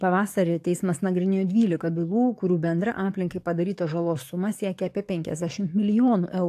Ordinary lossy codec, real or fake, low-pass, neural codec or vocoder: AAC, 64 kbps; fake; 14.4 kHz; codec, 44.1 kHz, 7.8 kbps, DAC